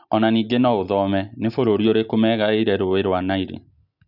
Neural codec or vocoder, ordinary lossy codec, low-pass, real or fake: none; none; 5.4 kHz; real